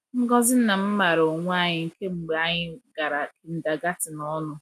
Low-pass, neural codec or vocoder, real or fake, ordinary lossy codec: 14.4 kHz; none; real; none